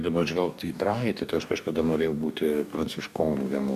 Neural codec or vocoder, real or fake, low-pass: codec, 44.1 kHz, 2.6 kbps, DAC; fake; 14.4 kHz